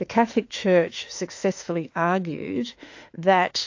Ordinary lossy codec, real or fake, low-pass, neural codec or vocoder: AAC, 48 kbps; fake; 7.2 kHz; autoencoder, 48 kHz, 32 numbers a frame, DAC-VAE, trained on Japanese speech